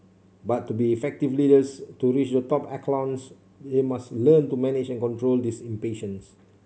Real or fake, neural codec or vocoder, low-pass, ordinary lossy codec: real; none; none; none